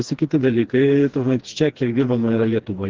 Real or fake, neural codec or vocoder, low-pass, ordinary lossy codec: fake; codec, 16 kHz, 2 kbps, FreqCodec, smaller model; 7.2 kHz; Opus, 16 kbps